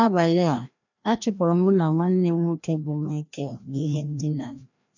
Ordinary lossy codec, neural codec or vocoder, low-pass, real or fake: none; codec, 16 kHz, 1 kbps, FreqCodec, larger model; 7.2 kHz; fake